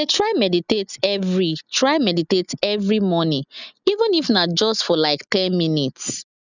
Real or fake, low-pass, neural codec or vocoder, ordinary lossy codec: real; 7.2 kHz; none; none